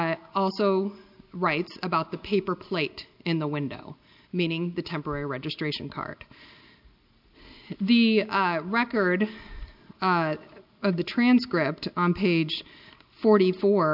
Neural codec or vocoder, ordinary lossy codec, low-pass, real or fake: none; MP3, 48 kbps; 5.4 kHz; real